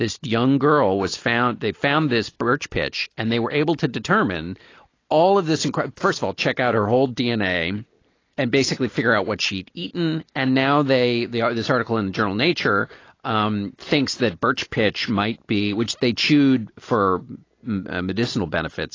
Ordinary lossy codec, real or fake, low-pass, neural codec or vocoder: AAC, 32 kbps; real; 7.2 kHz; none